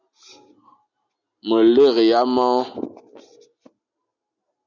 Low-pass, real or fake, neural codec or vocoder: 7.2 kHz; real; none